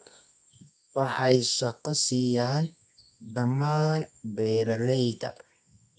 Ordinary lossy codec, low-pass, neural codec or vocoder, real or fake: none; none; codec, 24 kHz, 0.9 kbps, WavTokenizer, medium music audio release; fake